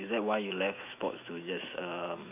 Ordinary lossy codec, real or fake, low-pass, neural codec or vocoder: none; fake; 3.6 kHz; autoencoder, 48 kHz, 128 numbers a frame, DAC-VAE, trained on Japanese speech